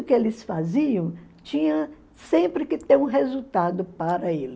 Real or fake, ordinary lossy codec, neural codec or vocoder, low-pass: real; none; none; none